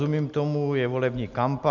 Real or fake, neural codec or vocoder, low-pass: real; none; 7.2 kHz